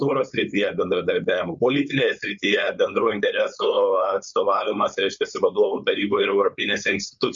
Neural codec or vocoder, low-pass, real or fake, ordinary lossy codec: codec, 16 kHz, 4.8 kbps, FACodec; 7.2 kHz; fake; Opus, 64 kbps